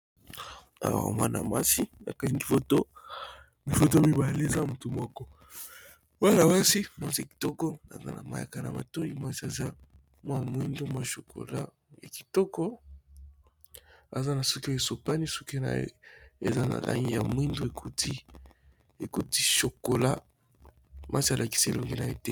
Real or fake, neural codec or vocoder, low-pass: real; none; 19.8 kHz